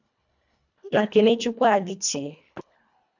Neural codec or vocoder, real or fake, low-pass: codec, 24 kHz, 1.5 kbps, HILCodec; fake; 7.2 kHz